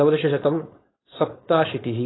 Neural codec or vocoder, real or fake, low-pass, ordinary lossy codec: codec, 16 kHz, 4.8 kbps, FACodec; fake; 7.2 kHz; AAC, 16 kbps